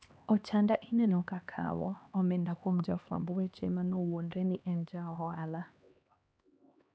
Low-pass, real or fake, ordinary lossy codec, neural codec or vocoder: none; fake; none; codec, 16 kHz, 2 kbps, X-Codec, HuBERT features, trained on LibriSpeech